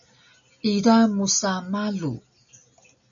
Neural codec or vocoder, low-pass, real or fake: none; 7.2 kHz; real